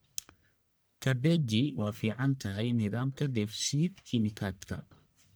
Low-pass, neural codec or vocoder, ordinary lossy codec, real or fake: none; codec, 44.1 kHz, 1.7 kbps, Pupu-Codec; none; fake